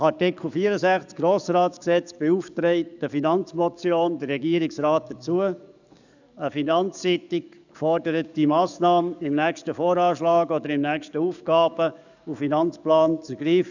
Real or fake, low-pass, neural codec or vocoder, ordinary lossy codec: fake; 7.2 kHz; codec, 44.1 kHz, 7.8 kbps, DAC; none